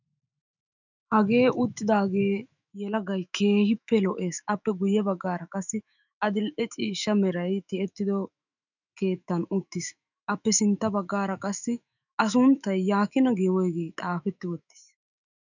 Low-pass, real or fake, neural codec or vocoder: 7.2 kHz; fake; autoencoder, 48 kHz, 128 numbers a frame, DAC-VAE, trained on Japanese speech